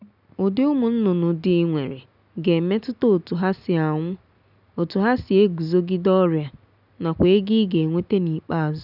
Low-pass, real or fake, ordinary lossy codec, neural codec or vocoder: 5.4 kHz; real; none; none